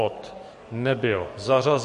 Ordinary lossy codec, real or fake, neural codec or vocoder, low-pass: MP3, 48 kbps; fake; codec, 44.1 kHz, 7.8 kbps, DAC; 14.4 kHz